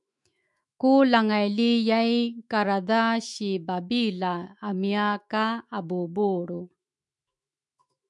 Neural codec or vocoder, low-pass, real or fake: autoencoder, 48 kHz, 128 numbers a frame, DAC-VAE, trained on Japanese speech; 10.8 kHz; fake